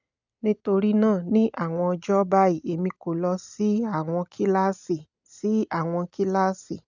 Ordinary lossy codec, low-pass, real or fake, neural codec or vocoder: none; 7.2 kHz; real; none